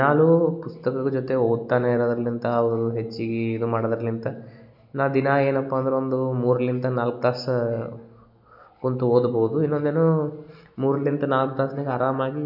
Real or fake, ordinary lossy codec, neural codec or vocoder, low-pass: real; none; none; 5.4 kHz